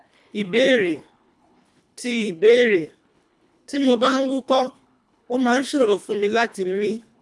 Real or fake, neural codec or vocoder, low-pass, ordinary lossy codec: fake; codec, 24 kHz, 1.5 kbps, HILCodec; none; none